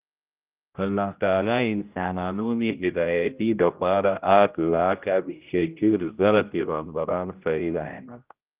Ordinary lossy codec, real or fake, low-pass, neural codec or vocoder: Opus, 32 kbps; fake; 3.6 kHz; codec, 16 kHz, 0.5 kbps, X-Codec, HuBERT features, trained on general audio